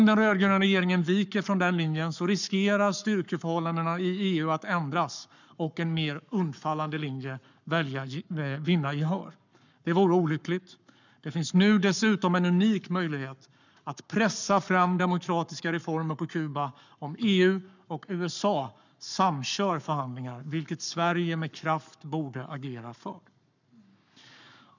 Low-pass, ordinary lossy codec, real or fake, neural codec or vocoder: 7.2 kHz; none; fake; codec, 44.1 kHz, 7.8 kbps, Pupu-Codec